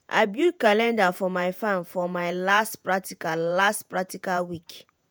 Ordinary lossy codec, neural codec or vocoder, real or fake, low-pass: none; vocoder, 48 kHz, 128 mel bands, Vocos; fake; none